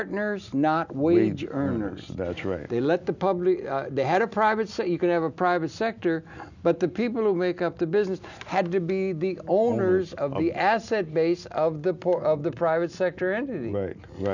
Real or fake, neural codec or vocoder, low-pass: real; none; 7.2 kHz